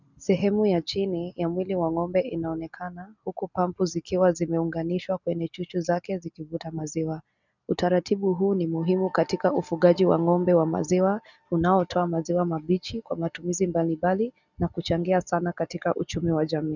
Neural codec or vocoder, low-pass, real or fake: none; 7.2 kHz; real